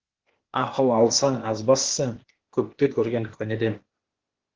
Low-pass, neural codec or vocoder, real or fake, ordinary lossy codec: 7.2 kHz; codec, 16 kHz, 0.8 kbps, ZipCodec; fake; Opus, 16 kbps